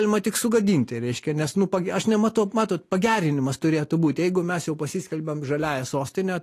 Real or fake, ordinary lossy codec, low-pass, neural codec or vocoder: real; AAC, 48 kbps; 14.4 kHz; none